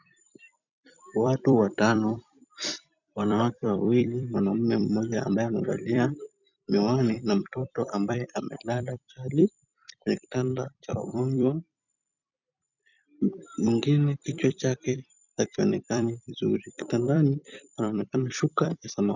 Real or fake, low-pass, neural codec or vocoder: fake; 7.2 kHz; vocoder, 44.1 kHz, 128 mel bands every 256 samples, BigVGAN v2